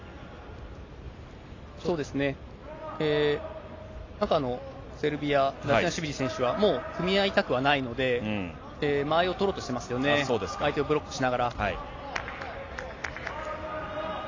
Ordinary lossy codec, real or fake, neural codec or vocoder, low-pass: AAC, 32 kbps; real; none; 7.2 kHz